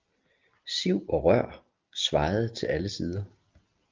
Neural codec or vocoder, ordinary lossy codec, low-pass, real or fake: none; Opus, 24 kbps; 7.2 kHz; real